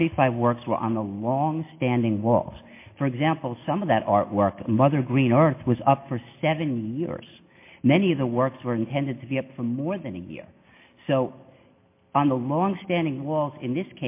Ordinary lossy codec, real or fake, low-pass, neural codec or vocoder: MP3, 24 kbps; real; 3.6 kHz; none